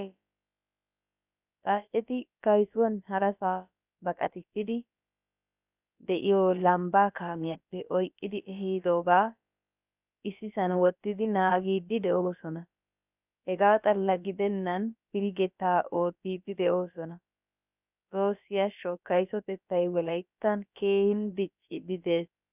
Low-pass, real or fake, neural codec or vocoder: 3.6 kHz; fake; codec, 16 kHz, about 1 kbps, DyCAST, with the encoder's durations